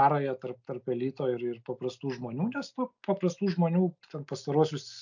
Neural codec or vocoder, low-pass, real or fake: none; 7.2 kHz; real